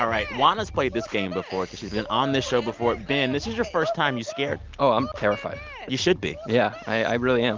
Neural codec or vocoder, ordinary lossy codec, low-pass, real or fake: none; Opus, 24 kbps; 7.2 kHz; real